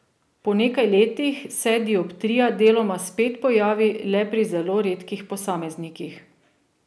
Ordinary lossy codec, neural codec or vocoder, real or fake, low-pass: none; none; real; none